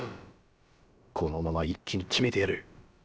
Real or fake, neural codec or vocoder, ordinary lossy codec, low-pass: fake; codec, 16 kHz, about 1 kbps, DyCAST, with the encoder's durations; none; none